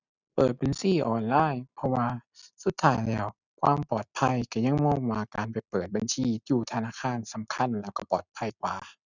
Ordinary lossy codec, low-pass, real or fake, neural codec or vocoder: none; 7.2 kHz; real; none